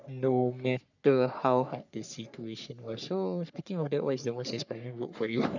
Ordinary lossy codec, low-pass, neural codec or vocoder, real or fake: none; 7.2 kHz; codec, 44.1 kHz, 3.4 kbps, Pupu-Codec; fake